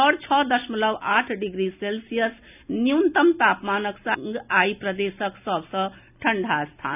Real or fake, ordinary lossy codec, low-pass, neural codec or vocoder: real; none; 3.6 kHz; none